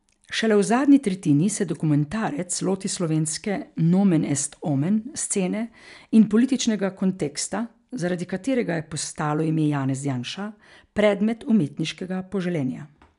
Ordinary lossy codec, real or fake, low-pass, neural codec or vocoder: none; real; 10.8 kHz; none